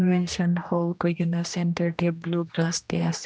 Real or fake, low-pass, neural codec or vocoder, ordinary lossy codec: fake; none; codec, 16 kHz, 1 kbps, X-Codec, HuBERT features, trained on general audio; none